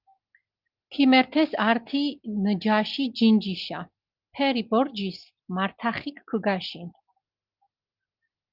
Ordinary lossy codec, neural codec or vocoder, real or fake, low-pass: Opus, 32 kbps; none; real; 5.4 kHz